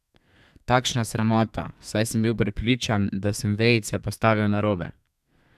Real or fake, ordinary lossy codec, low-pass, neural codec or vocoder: fake; none; 14.4 kHz; codec, 32 kHz, 1.9 kbps, SNAC